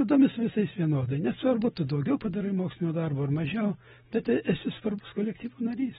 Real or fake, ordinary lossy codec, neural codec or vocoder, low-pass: real; AAC, 16 kbps; none; 19.8 kHz